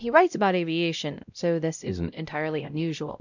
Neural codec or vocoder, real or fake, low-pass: codec, 16 kHz, 0.5 kbps, X-Codec, WavLM features, trained on Multilingual LibriSpeech; fake; 7.2 kHz